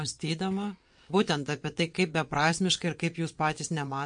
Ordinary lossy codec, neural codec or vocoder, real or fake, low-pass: MP3, 48 kbps; vocoder, 22.05 kHz, 80 mel bands, WaveNeXt; fake; 9.9 kHz